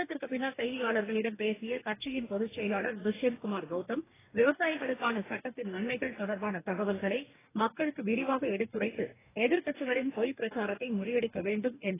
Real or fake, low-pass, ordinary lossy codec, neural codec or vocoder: fake; 3.6 kHz; AAC, 16 kbps; codec, 44.1 kHz, 2.6 kbps, DAC